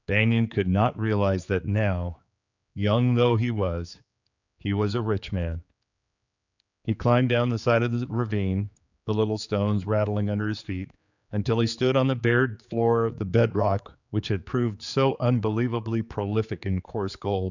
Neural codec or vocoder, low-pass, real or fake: codec, 16 kHz, 4 kbps, X-Codec, HuBERT features, trained on general audio; 7.2 kHz; fake